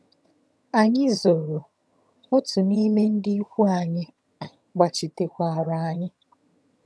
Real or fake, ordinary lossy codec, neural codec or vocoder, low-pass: fake; none; vocoder, 22.05 kHz, 80 mel bands, HiFi-GAN; none